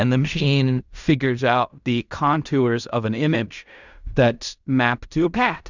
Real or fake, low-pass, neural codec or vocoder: fake; 7.2 kHz; codec, 16 kHz in and 24 kHz out, 0.4 kbps, LongCat-Audio-Codec, fine tuned four codebook decoder